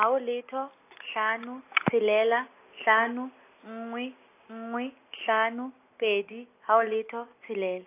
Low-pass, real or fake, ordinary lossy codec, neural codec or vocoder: 3.6 kHz; real; AAC, 24 kbps; none